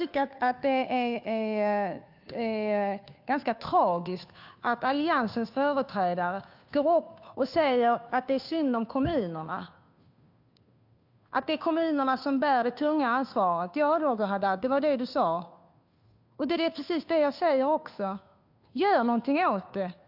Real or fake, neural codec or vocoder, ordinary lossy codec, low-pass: fake; codec, 16 kHz, 2 kbps, FunCodec, trained on Chinese and English, 25 frames a second; AAC, 48 kbps; 5.4 kHz